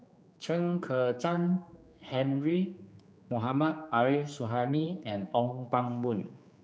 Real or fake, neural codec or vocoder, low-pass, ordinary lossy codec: fake; codec, 16 kHz, 4 kbps, X-Codec, HuBERT features, trained on general audio; none; none